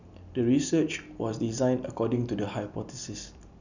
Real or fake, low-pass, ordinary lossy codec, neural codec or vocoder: real; 7.2 kHz; none; none